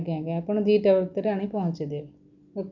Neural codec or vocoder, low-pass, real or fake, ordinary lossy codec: none; 7.2 kHz; real; none